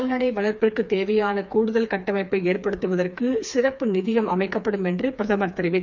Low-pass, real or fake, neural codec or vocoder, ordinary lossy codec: 7.2 kHz; fake; codec, 16 kHz, 4 kbps, FreqCodec, smaller model; none